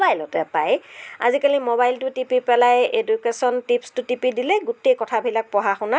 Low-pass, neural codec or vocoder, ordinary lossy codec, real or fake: none; none; none; real